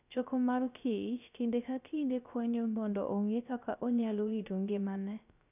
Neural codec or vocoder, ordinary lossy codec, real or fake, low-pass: codec, 16 kHz, 0.3 kbps, FocalCodec; none; fake; 3.6 kHz